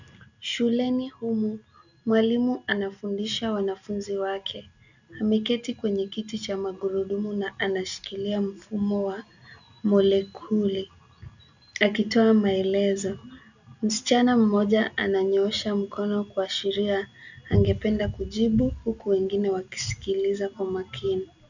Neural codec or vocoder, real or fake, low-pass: none; real; 7.2 kHz